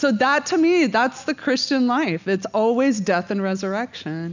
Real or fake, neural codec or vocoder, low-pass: real; none; 7.2 kHz